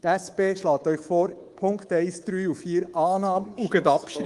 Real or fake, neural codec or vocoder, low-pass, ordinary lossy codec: fake; codec, 24 kHz, 3.1 kbps, DualCodec; 10.8 kHz; Opus, 24 kbps